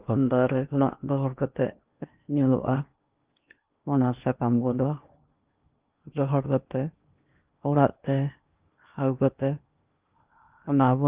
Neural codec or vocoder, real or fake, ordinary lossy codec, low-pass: codec, 16 kHz in and 24 kHz out, 0.8 kbps, FocalCodec, streaming, 65536 codes; fake; Opus, 32 kbps; 3.6 kHz